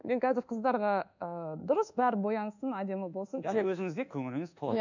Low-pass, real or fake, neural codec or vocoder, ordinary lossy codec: 7.2 kHz; fake; codec, 24 kHz, 1.2 kbps, DualCodec; none